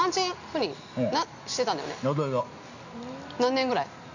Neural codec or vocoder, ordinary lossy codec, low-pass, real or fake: none; none; 7.2 kHz; real